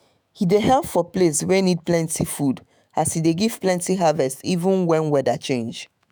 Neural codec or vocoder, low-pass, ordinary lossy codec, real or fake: autoencoder, 48 kHz, 128 numbers a frame, DAC-VAE, trained on Japanese speech; none; none; fake